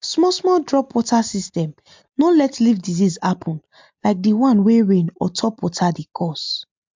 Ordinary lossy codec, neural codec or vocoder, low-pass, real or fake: MP3, 64 kbps; none; 7.2 kHz; real